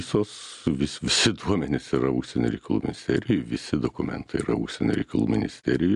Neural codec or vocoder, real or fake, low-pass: none; real; 10.8 kHz